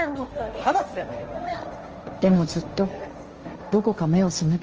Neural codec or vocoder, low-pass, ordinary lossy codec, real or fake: codec, 16 kHz, 1.1 kbps, Voila-Tokenizer; 7.2 kHz; Opus, 24 kbps; fake